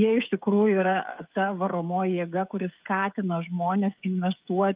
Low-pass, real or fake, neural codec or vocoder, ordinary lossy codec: 3.6 kHz; fake; codec, 16 kHz, 16 kbps, FreqCodec, smaller model; Opus, 24 kbps